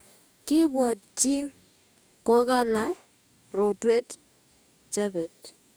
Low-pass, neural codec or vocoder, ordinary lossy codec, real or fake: none; codec, 44.1 kHz, 2.6 kbps, DAC; none; fake